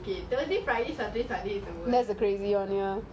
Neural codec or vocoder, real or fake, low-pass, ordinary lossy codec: none; real; none; none